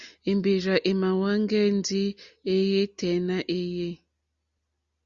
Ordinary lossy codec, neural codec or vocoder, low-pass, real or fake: Opus, 64 kbps; none; 7.2 kHz; real